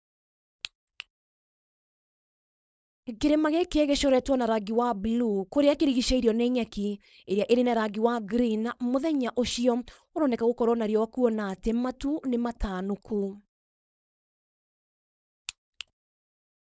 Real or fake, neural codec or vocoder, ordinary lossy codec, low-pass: fake; codec, 16 kHz, 4.8 kbps, FACodec; none; none